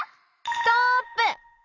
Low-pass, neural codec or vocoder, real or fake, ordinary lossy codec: 7.2 kHz; none; real; none